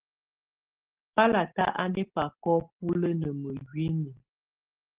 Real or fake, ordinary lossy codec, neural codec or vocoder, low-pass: real; Opus, 16 kbps; none; 3.6 kHz